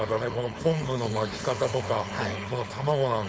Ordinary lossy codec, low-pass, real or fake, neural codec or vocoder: none; none; fake; codec, 16 kHz, 4.8 kbps, FACodec